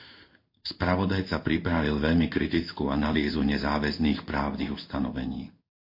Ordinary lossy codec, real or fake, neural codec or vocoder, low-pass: MP3, 32 kbps; fake; codec, 16 kHz in and 24 kHz out, 1 kbps, XY-Tokenizer; 5.4 kHz